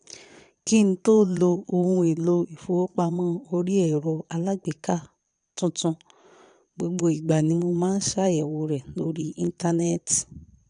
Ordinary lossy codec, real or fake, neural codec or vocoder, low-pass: none; fake; vocoder, 22.05 kHz, 80 mel bands, Vocos; 9.9 kHz